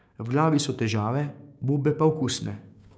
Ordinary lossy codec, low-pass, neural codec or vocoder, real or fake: none; none; codec, 16 kHz, 6 kbps, DAC; fake